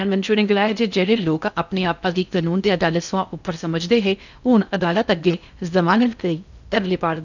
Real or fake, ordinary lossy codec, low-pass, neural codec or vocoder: fake; none; 7.2 kHz; codec, 16 kHz in and 24 kHz out, 0.6 kbps, FocalCodec, streaming, 4096 codes